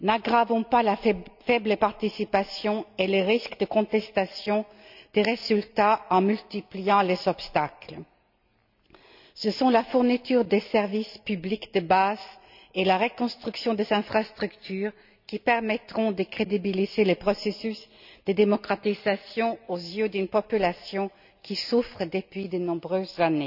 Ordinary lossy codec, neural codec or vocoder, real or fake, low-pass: none; none; real; 5.4 kHz